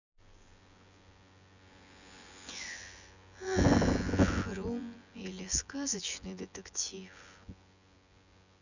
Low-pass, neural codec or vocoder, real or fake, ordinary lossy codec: 7.2 kHz; vocoder, 24 kHz, 100 mel bands, Vocos; fake; none